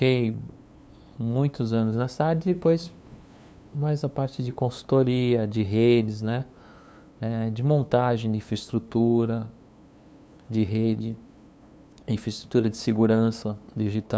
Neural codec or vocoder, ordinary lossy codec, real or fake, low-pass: codec, 16 kHz, 2 kbps, FunCodec, trained on LibriTTS, 25 frames a second; none; fake; none